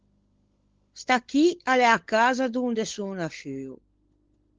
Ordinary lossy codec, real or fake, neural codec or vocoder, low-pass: Opus, 16 kbps; fake; codec, 16 kHz, 16 kbps, FunCodec, trained on LibriTTS, 50 frames a second; 7.2 kHz